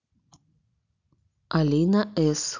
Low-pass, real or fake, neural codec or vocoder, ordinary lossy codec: 7.2 kHz; real; none; none